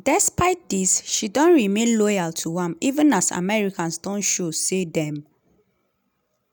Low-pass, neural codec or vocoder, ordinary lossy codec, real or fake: none; none; none; real